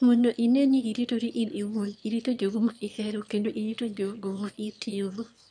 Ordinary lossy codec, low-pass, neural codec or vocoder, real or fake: none; 9.9 kHz; autoencoder, 22.05 kHz, a latent of 192 numbers a frame, VITS, trained on one speaker; fake